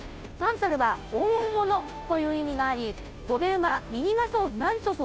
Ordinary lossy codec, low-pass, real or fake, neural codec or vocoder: none; none; fake; codec, 16 kHz, 0.5 kbps, FunCodec, trained on Chinese and English, 25 frames a second